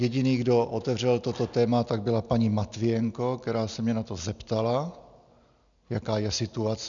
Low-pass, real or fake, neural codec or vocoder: 7.2 kHz; real; none